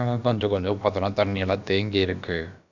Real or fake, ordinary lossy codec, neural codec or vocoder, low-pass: fake; none; codec, 16 kHz, about 1 kbps, DyCAST, with the encoder's durations; 7.2 kHz